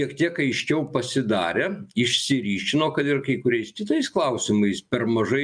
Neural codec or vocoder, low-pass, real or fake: none; 9.9 kHz; real